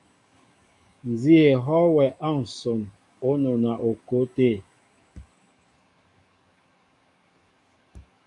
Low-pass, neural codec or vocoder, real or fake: 10.8 kHz; codec, 44.1 kHz, 7.8 kbps, DAC; fake